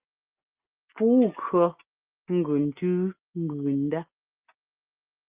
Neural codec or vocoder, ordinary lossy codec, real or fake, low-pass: none; Opus, 24 kbps; real; 3.6 kHz